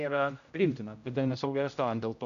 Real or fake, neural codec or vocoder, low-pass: fake; codec, 16 kHz, 0.5 kbps, X-Codec, HuBERT features, trained on general audio; 7.2 kHz